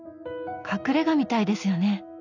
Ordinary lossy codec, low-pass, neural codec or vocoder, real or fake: none; 7.2 kHz; none; real